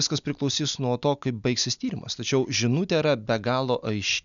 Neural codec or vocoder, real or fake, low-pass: none; real; 7.2 kHz